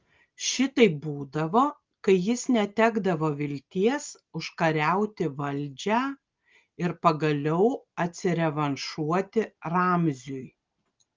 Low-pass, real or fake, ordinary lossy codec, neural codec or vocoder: 7.2 kHz; real; Opus, 32 kbps; none